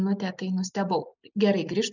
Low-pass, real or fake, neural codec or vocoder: 7.2 kHz; real; none